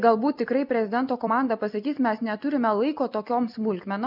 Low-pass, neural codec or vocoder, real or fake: 5.4 kHz; none; real